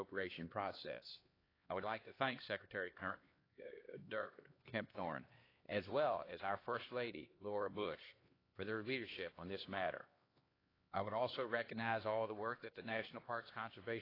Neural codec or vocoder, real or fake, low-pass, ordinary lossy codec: codec, 16 kHz, 2 kbps, X-Codec, HuBERT features, trained on LibriSpeech; fake; 5.4 kHz; AAC, 24 kbps